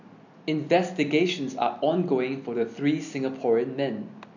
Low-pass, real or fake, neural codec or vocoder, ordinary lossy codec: 7.2 kHz; real; none; none